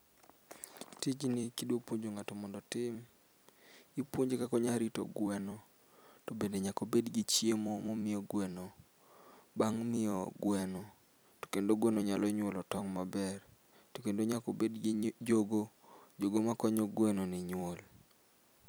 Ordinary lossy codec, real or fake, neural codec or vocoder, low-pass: none; fake; vocoder, 44.1 kHz, 128 mel bands every 256 samples, BigVGAN v2; none